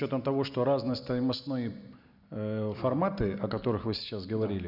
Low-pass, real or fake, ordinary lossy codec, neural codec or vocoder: 5.4 kHz; real; none; none